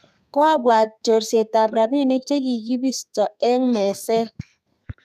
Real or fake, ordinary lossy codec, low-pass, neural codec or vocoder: fake; none; 14.4 kHz; codec, 32 kHz, 1.9 kbps, SNAC